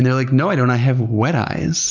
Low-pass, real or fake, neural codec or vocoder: 7.2 kHz; real; none